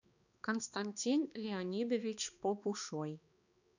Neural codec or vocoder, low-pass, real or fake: codec, 16 kHz, 2 kbps, X-Codec, HuBERT features, trained on balanced general audio; 7.2 kHz; fake